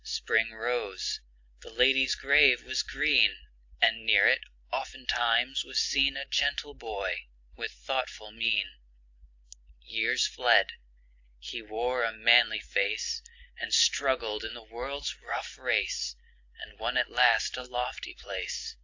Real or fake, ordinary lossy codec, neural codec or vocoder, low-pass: real; AAC, 48 kbps; none; 7.2 kHz